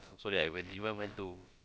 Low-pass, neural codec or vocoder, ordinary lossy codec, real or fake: none; codec, 16 kHz, about 1 kbps, DyCAST, with the encoder's durations; none; fake